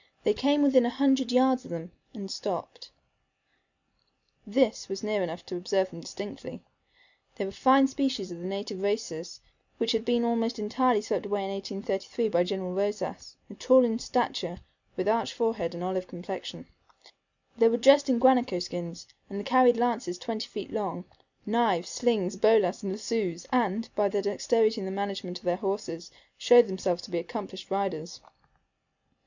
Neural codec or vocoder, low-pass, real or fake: none; 7.2 kHz; real